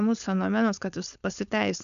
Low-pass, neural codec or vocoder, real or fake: 7.2 kHz; codec, 16 kHz, 4.8 kbps, FACodec; fake